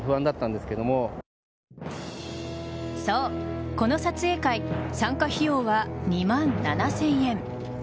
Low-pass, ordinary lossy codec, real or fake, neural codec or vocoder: none; none; real; none